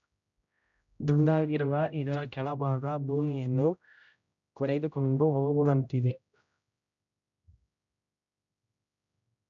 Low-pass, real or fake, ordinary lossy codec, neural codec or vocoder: 7.2 kHz; fake; none; codec, 16 kHz, 0.5 kbps, X-Codec, HuBERT features, trained on general audio